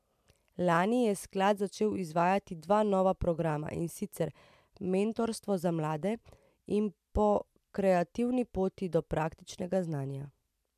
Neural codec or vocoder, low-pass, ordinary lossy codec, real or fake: none; 14.4 kHz; MP3, 96 kbps; real